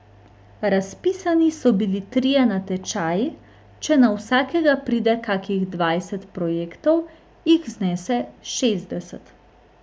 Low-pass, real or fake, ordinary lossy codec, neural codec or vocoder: none; real; none; none